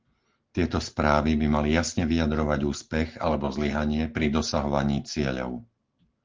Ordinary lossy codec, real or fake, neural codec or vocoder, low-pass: Opus, 16 kbps; real; none; 7.2 kHz